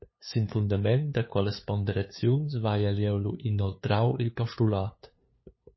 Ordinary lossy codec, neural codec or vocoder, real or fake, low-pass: MP3, 24 kbps; codec, 16 kHz, 2 kbps, FunCodec, trained on LibriTTS, 25 frames a second; fake; 7.2 kHz